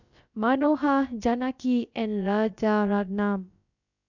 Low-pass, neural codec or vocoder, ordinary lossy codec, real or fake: 7.2 kHz; codec, 16 kHz, about 1 kbps, DyCAST, with the encoder's durations; none; fake